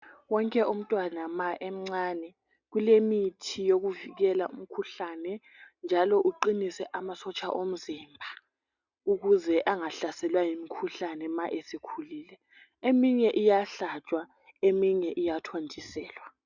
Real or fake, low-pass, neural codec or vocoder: real; 7.2 kHz; none